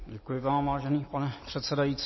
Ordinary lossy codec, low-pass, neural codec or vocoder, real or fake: MP3, 24 kbps; 7.2 kHz; none; real